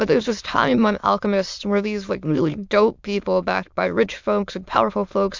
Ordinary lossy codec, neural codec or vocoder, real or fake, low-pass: MP3, 64 kbps; autoencoder, 22.05 kHz, a latent of 192 numbers a frame, VITS, trained on many speakers; fake; 7.2 kHz